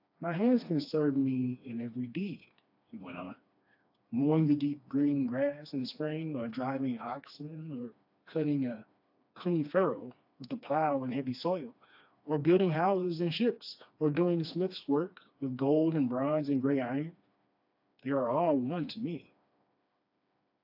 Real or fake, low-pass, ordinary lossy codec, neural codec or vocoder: fake; 5.4 kHz; AAC, 48 kbps; codec, 16 kHz, 2 kbps, FreqCodec, smaller model